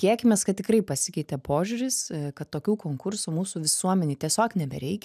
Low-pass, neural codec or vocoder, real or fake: 14.4 kHz; none; real